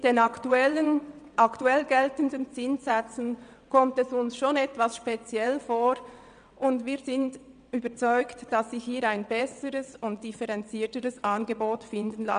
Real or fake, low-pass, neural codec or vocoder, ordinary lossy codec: fake; 9.9 kHz; vocoder, 22.05 kHz, 80 mel bands, WaveNeXt; none